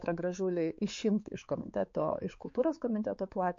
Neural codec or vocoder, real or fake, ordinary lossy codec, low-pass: codec, 16 kHz, 4 kbps, X-Codec, HuBERT features, trained on balanced general audio; fake; MP3, 48 kbps; 7.2 kHz